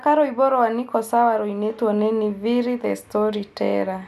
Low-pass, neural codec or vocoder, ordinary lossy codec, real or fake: 14.4 kHz; none; none; real